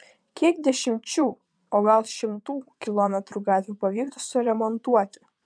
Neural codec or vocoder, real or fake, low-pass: vocoder, 22.05 kHz, 80 mel bands, WaveNeXt; fake; 9.9 kHz